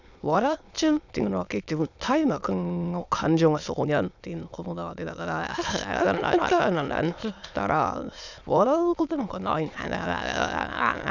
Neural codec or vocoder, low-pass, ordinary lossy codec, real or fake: autoencoder, 22.05 kHz, a latent of 192 numbers a frame, VITS, trained on many speakers; 7.2 kHz; none; fake